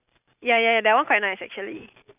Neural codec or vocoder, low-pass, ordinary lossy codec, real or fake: vocoder, 44.1 kHz, 128 mel bands every 512 samples, BigVGAN v2; 3.6 kHz; none; fake